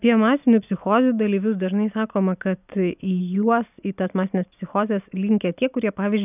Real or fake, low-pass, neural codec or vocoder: real; 3.6 kHz; none